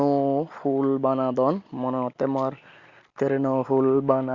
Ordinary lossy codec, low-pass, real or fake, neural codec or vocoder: Opus, 64 kbps; 7.2 kHz; real; none